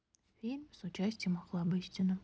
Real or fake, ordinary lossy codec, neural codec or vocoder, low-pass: real; none; none; none